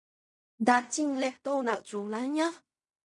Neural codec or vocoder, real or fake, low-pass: codec, 16 kHz in and 24 kHz out, 0.4 kbps, LongCat-Audio-Codec, fine tuned four codebook decoder; fake; 10.8 kHz